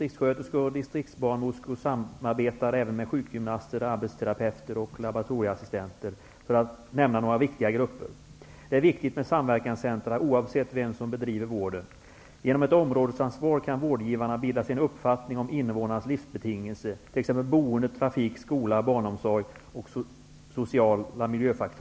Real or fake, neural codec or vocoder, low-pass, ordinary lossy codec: real; none; none; none